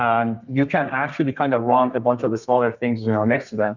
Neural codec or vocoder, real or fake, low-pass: codec, 32 kHz, 1.9 kbps, SNAC; fake; 7.2 kHz